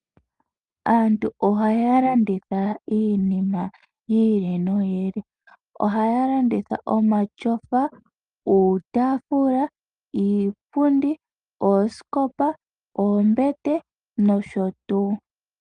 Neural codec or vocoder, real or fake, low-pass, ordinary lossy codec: none; real; 9.9 kHz; Opus, 32 kbps